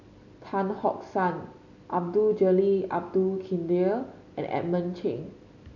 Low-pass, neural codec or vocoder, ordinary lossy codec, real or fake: 7.2 kHz; none; none; real